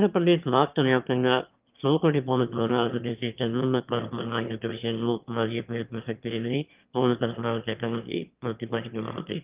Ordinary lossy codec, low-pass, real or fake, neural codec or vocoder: Opus, 24 kbps; 3.6 kHz; fake; autoencoder, 22.05 kHz, a latent of 192 numbers a frame, VITS, trained on one speaker